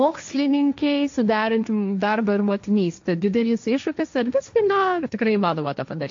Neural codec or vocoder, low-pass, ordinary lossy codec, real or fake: codec, 16 kHz, 1.1 kbps, Voila-Tokenizer; 7.2 kHz; MP3, 48 kbps; fake